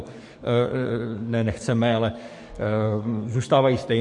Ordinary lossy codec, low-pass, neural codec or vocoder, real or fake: MP3, 48 kbps; 10.8 kHz; codec, 44.1 kHz, 7.8 kbps, DAC; fake